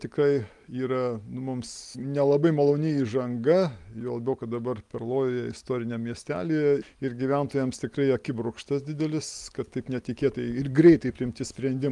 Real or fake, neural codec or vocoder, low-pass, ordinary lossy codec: real; none; 10.8 kHz; Opus, 32 kbps